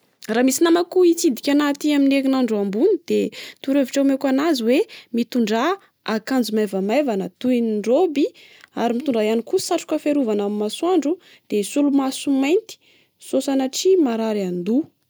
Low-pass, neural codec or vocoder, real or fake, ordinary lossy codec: none; none; real; none